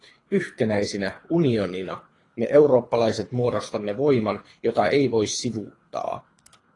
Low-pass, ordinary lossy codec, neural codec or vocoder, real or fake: 10.8 kHz; AAC, 32 kbps; codec, 24 kHz, 3 kbps, HILCodec; fake